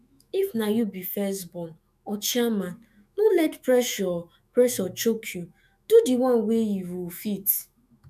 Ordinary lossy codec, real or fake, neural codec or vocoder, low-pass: none; fake; autoencoder, 48 kHz, 128 numbers a frame, DAC-VAE, trained on Japanese speech; 14.4 kHz